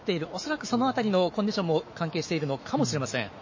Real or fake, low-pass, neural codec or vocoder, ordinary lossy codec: fake; 7.2 kHz; autoencoder, 48 kHz, 128 numbers a frame, DAC-VAE, trained on Japanese speech; MP3, 32 kbps